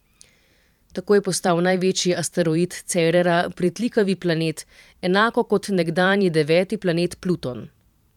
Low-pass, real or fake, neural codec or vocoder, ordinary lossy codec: 19.8 kHz; fake; vocoder, 44.1 kHz, 128 mel bands every 512 samples, BigVGAN v2; none